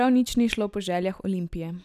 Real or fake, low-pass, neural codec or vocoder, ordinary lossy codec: real; 14.4 kHz; none; none